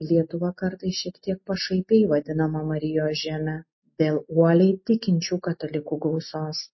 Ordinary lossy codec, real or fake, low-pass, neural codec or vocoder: MP3, 24 kbps; real; 7.2 kHz; none